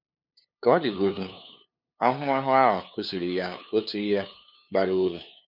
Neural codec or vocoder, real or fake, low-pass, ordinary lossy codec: codec, 16 kHz, 2 kbps, FunCodec, trained on LibriTTS, 25 frames a second; fake; 5.4 kHz; none